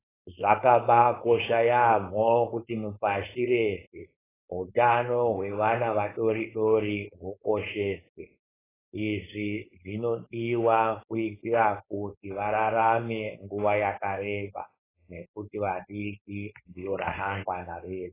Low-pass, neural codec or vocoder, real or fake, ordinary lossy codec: 3.6 kHz; codec, 16 kHz, 4.8 kbps, FACodec; fake; AAC, 16 kbps